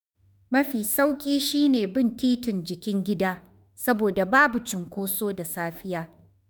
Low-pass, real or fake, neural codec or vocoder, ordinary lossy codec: none; fake; autoencoder, 48 kHz, 32 numbers a frame, DAC-VAE, trained on Japanese speech; none